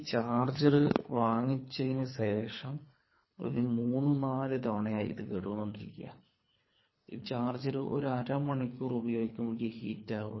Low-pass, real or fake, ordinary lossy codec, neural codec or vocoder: 7.2 kHz; fake; MP3, 24 kbps; codec, 24 kHz, 3 kbps, HILCodec